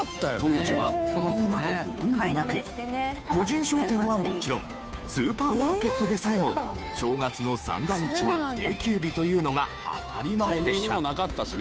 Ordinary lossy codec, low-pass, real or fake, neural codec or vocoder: none; none; fake; codec, 16 kHz, 2 kbps, FunCodec, trained on Chinese and English, 25 frames a second